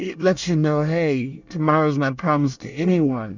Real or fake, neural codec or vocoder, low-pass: fake; codec, 24 kHz, 1 kbps, SNAC; 7.2 kHz